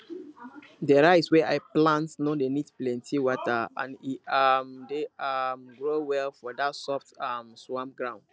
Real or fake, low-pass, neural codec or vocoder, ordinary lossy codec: real; none; none; none